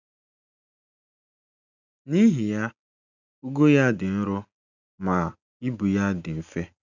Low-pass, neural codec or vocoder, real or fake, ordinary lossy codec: 7.2 kHz; none; real; none